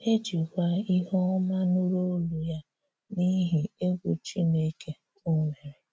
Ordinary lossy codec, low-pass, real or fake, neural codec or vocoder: none; none; real; none